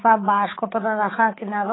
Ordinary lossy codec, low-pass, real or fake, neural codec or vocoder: AAC, 16 kbps; 7.2 kHz; fake; codec, 16 kHz, 4 kbps, X-Codec, HuBERT features, trained on balanced general audio